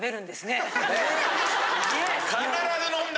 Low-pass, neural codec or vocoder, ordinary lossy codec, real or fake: none; none; none; real